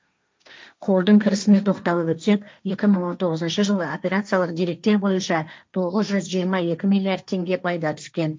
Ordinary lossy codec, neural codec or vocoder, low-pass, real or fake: none; codec, 16 kHz, 1.1 kbps, Voila-Tokenizer; none; fake